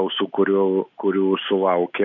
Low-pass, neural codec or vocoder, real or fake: 7.2 kHz; none; real